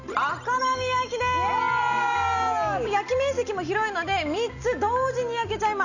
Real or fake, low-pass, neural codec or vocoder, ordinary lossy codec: real; 7.2 kHz; none; none